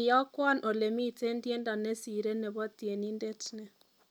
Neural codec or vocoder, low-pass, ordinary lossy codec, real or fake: none; none; none; real